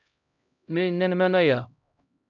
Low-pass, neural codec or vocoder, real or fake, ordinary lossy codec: 7.2 kHz; codec, 16 kHz, 0.5 kbps, X-Codec, HuBERT features, trained on LibriSpeech; fake; MP3, 96 kbps